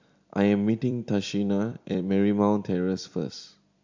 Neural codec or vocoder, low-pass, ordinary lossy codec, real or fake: vocoder, 44.1 kHz, 128 mel bands every 256 samples, BigVGAN v2; 7.2 kHz; MP3, 64 kbps; fake